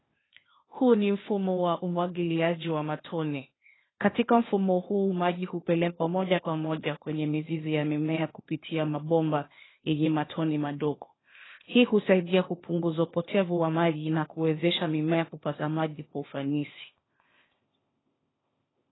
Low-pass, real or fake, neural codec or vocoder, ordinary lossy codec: 7.2 kHz; fake; codec, 16 kHz, 0.8 kbps, ZipCodec; AAC, 16 kbps